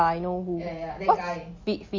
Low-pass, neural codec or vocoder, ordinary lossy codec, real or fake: 7.2 kHz; none; MP3, 32 kbps; real